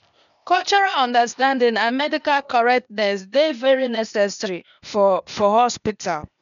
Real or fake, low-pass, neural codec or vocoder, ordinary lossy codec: fake; 7.2 kHz; codec, 16 kHz, 0.8 kbps, ZipCodec; none